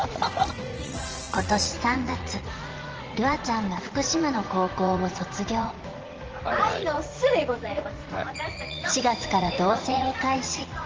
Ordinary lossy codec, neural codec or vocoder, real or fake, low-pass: Opus, 16 kbps; vocoder, 44.1 kHz, 80 mel bands, Vocos; fake; 7.2 kHz